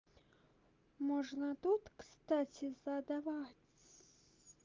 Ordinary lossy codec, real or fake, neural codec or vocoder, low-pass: Opus, 24 kbps; real; none; 7.2 kHz